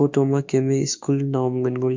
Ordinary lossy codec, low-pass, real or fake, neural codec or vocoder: MP3, 48 kbps; 7.2 kHz; fake; autoencoder, 48 kHz, 32 numbers a frame, DAC-VAE, trained on Japanese speech